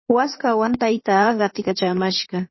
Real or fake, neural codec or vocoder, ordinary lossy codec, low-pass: fake; codec, 16 kHz in and 24 kHz out, 2.2 kbps, FireRedTTS-2 codec; MP3, 24 kbps; 7.2 kHz